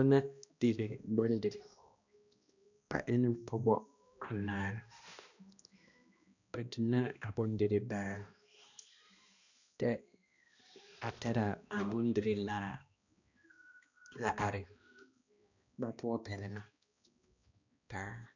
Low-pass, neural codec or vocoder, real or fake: 7.2 kHz; codec, 16 kHz, 1 kbps, X-Codec, HuBERT features, trained on balanced general audio; fake